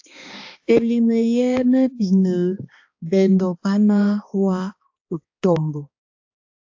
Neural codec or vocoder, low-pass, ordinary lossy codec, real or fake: codec, 16 kHz, 2 kbps, X-Codec, HuBERT features, trained on balanced general audio; 7.2 kHz; AAC, 48 kbps; fake